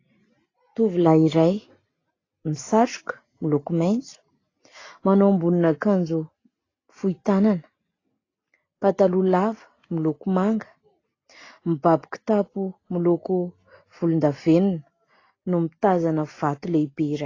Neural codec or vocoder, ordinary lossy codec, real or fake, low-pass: none; AAC, 32 kbps; real; 7.2 kHz